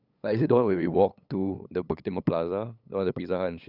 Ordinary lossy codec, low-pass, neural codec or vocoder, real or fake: none; 5.4 kHz; codec, 16 kHz, 8 kbps, FunCodec, trained on LibriTTS, 25 frames a second; fake